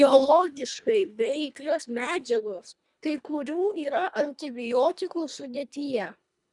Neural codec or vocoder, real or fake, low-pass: codec, 24 kHz, 1.5 kbps, HILCodec; fake; 10.8 kHz